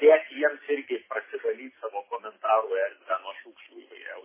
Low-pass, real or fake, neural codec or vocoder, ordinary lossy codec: 3.6 kHz; real; none; MP3, 16 kbps